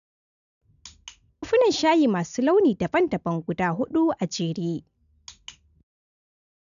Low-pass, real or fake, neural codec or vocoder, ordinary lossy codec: 7.2 kHz; real; none; none